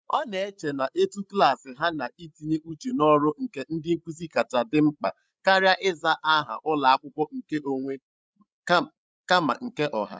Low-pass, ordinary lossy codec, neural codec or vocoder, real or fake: none; none; codec, 16 kHz, 16 kbps, FreqCodec, larger model; fake